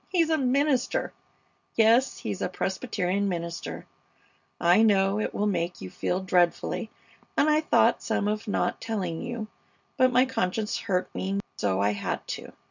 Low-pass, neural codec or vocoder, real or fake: 7.2 kHz; none; real